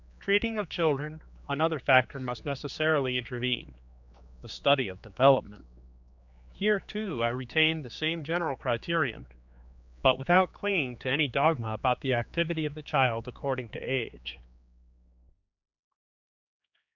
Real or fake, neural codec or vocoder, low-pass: fake; codec, 16 kHz, 4 kbps, X-Codec, HuBERT features, trained on general audio; 7.2 kHz